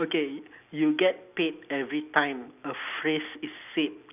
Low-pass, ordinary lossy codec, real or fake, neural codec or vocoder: 3.6 kHz; none; real; none